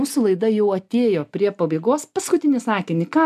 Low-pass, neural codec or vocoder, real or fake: 14.4 kHz; none; real